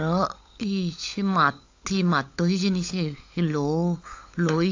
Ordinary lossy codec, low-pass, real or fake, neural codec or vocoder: AAC, 48 kbps; 7.2 kHz; fake; codec, 16 kHz, 16 kbps, FunCodec, trained on LibriTTS, 50 frames a second